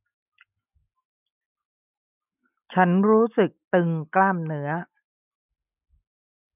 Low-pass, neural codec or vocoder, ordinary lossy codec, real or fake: 3.6 kHz; none; none; real